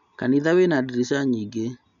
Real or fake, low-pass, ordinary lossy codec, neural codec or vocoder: real; 7.2 kHz; none; none